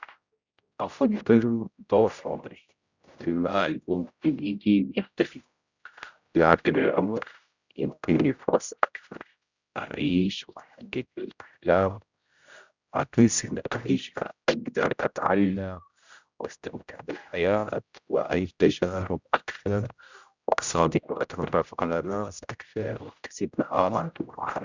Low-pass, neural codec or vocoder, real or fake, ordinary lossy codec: 7.2 kHz; codec, 16 kHz, 0.5 kbps, X-Codec, HuBERT features, trained on general audio; fake; Opus, 64 kbps